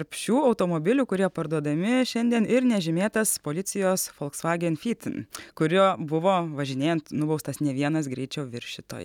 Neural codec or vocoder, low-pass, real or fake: none; 19.8 kHz; real